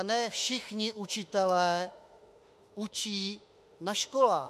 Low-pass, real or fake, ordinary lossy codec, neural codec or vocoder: 14.4 kHz; fake; MP3, 64 kbps; autoencoder, 48 kHz, 32 numbers a frame, DAC-VAE, trained on Japanese speech